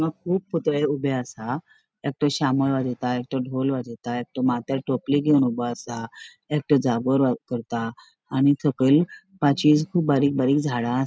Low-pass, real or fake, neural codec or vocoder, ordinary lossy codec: none; real; none; none